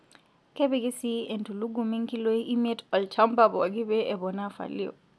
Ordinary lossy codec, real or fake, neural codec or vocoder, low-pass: none; real; none; none